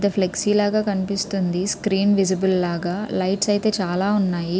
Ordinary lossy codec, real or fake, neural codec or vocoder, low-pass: none; real; none; none